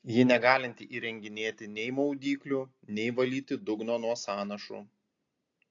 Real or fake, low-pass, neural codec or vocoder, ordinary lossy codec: real; 7.2 kHz; none; AAC, 48 kbps